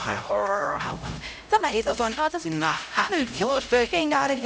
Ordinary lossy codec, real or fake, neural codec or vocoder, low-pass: none; fake; codec, 16 kHz, 0.5 kbps, X-Codec, HuBERT features, trained on LibriSpeech; none